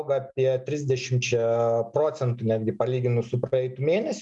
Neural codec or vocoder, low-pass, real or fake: none; 10.8 kHz; real